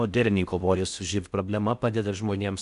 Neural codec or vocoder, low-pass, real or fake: codec, 16 kHz in and 24 kHz out, 0.6 kbps, FocalCodec, streaming, 4096 codes; 10.8 kHz; fake